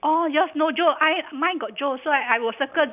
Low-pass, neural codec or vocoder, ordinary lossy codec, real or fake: 3.6 kHz; none; none; real